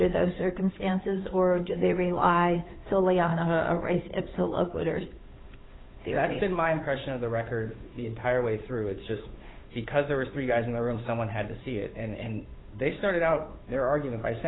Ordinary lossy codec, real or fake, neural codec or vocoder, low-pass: AAC, 16 kbps; fake; codec, 16 kHz, 8 kbps, FunCodec, trained on Chinese and English, 25 frames a second; 7.2 kHz